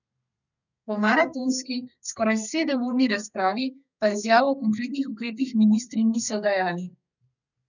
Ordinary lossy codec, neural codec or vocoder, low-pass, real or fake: none; codec, 32 kHz, 1.9 kbps, SNAC; 7.2 kHz; fake